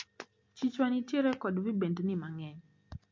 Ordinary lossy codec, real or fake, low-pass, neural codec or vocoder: MP3, 48 kbps; real; 7.2 kHz; none